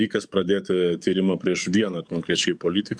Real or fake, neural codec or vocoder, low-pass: fake; codec, 44.1 kHz, 7.8 kbps, DAC; 9.9 kHz